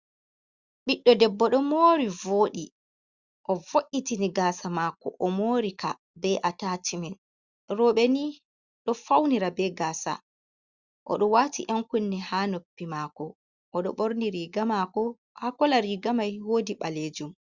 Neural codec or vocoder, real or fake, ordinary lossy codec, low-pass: none; real; Opus, 64 kbps; 7.2 kHz